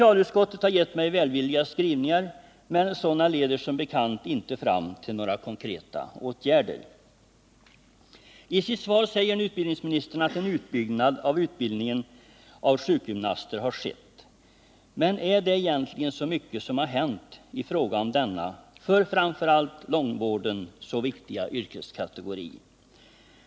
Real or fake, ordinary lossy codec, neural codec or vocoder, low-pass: real; none; none; none